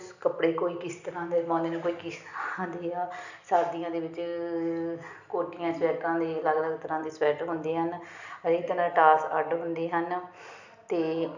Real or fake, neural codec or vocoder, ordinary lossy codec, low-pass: real; none; none; 7.2 kHz